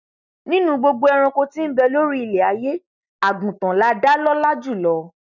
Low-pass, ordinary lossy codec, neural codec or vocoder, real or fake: 7.2 kHz; none; none; real